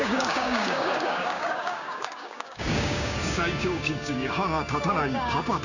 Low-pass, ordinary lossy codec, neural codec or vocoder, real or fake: 7.2 kHz; none; codec, 16 kHz, 6 kbps, DAC; fake